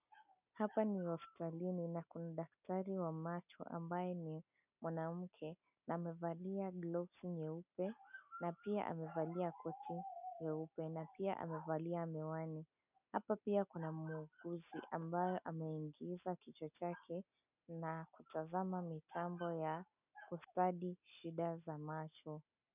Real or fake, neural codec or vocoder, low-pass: real; none; 3.6 kHz